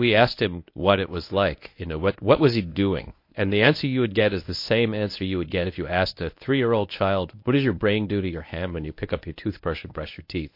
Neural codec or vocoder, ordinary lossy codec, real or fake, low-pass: codec, 24 kHz, 0.9 kbps, WavTokenizer, medium speech release version 1; MP3, 32 kbps; fake; 5.4 kHz